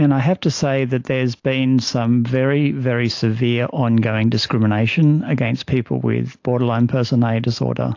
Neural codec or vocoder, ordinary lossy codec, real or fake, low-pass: none; AAC, 48 kbps; real; 7.2 kHz